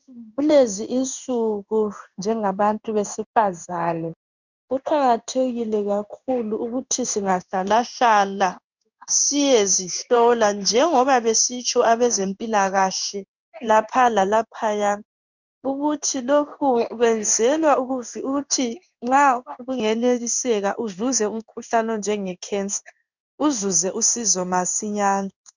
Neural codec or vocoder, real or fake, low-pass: codec, 16 kHz in and 24 kHz out, 1 kbps, XY-Tokenizer; fake; 7.2 kHz